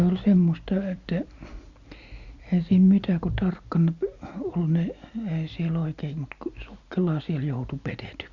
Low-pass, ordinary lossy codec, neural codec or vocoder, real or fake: 7.2 kHz; Opus, 64 kbps; none; real